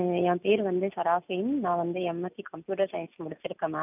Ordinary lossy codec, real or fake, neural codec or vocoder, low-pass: none; real; none; 3.6 kHz